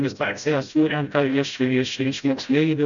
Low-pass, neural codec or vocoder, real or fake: 7.2 kHz; codec, 16 kHz, 0.5 kbps, FreqCodec, smaller model; fake